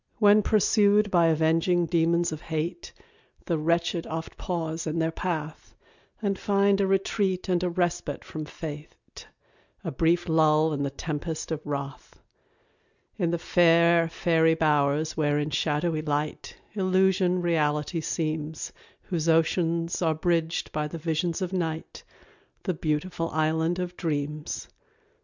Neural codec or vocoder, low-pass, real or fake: none; 7.2 kHz; real